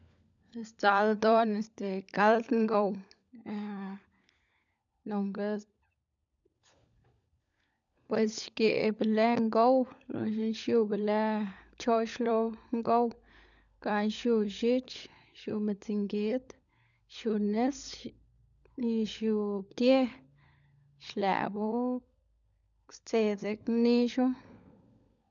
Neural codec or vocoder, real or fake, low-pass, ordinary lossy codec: codec, 16 kHz, 16 kbps, FunCodec, trained on LibriTTS, 50 frames a second; fake; 7.2 kHz; none